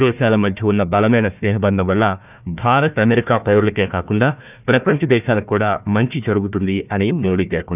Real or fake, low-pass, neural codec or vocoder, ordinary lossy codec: fake; 3.6 kHz; codec, 16 kHz, 1 kbps, FunCodec, trained on Chinese and English, 50 frames a second; none